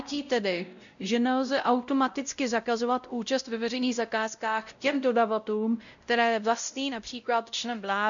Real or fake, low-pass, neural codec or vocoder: fake; 7.2 kHz; codec, 16 kHz, 0.5 kbps, X-Codec, WavLM features, trained on Multilingual LibriSpeech